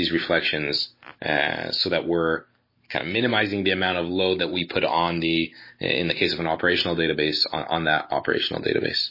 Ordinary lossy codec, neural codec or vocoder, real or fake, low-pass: MP3, 24 kbps; none; real; 5.4 kHz